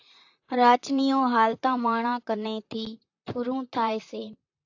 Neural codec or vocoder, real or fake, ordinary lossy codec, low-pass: vocoder, 44.1 kHz, 128 mel bands, Pupu-Vocoder; fake; AAC, 48 kbps; 7.2 kHz